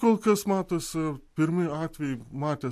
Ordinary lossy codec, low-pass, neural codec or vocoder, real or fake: MP3, 64 kbps; 14.4 kHz; none; real